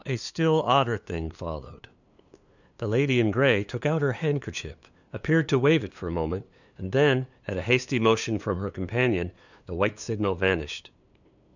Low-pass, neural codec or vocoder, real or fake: 7.2 kHz; codec, 16 kHz, 2 kbps, FunCodec, trained on LibriTTS, 25 frames a second; fake